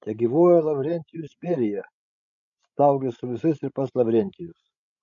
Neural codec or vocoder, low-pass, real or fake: codec, 16 kHz, 16 kbps, FreqCodec, larger model; 7.2 kHz; fake